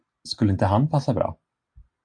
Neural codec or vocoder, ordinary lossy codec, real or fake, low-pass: none; AAC, 64 kbps; real; 9.9 kHz